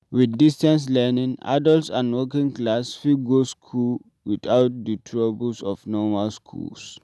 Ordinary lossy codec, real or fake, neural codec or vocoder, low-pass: none; real; none; none